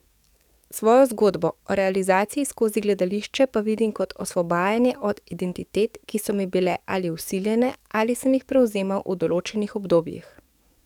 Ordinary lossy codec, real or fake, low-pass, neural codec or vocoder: none; fake; 19.8 kHz; codec, 44.1 kHz, 7.8 kbps, DAC